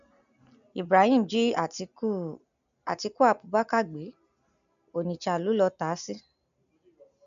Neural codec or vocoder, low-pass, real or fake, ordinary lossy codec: none; 7.2 kHz; real; none